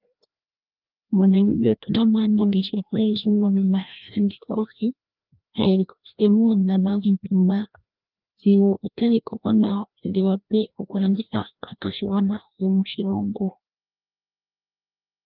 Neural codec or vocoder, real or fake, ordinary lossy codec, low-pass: codec, 16 kHz, 1 kbps, FreqCodec, larger model; fake; Opus, 24 kbps; 5.4 kHz